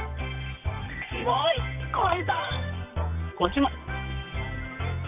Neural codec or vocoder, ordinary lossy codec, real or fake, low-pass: codec, 24 kHz, 0.9 kbps, WavTokenizer, medium music audio release; none; fake; 3.6 kHz